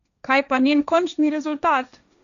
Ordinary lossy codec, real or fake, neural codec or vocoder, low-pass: none; fake; codec, 16 kHz, 1.1 kbps, Voila-Tokenizer; 7.2 kHz